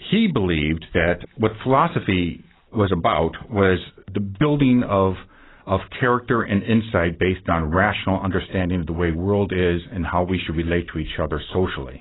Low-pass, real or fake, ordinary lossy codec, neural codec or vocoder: 7.2 kHz; real; AAC, 16 kbps; none